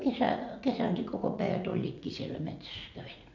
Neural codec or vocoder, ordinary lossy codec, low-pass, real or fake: vocoder, 44.1 kHz, 80 mel bands, Vocos; MP3, 64 kbps; 7.2 kHz; fake